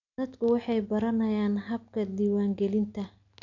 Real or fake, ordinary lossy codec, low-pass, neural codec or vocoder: real; none; 7.2 kHz; none